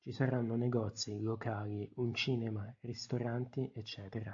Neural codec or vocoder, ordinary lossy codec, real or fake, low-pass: none; AAC, 64 kbps; real; 7.2 kHz